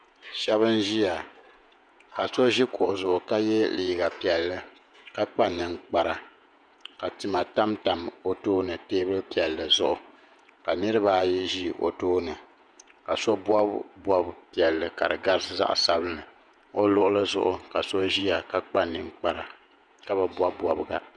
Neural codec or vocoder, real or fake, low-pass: vocoder, 48 kHz, 128 mel bands, Vocos; fake; 9.9 kHz